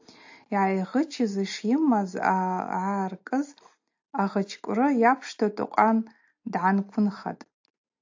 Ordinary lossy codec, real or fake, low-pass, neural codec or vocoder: AAC, 48 kbps; real; 7.2 kHz; none